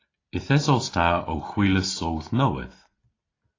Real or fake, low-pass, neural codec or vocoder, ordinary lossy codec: real; 7.2 kHz; none; AAC, 32 kbps